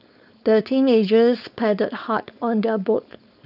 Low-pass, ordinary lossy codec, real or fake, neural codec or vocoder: 5.4 kHz; none; fake; codec, 16 kHz, 4.8 kbps, FACodec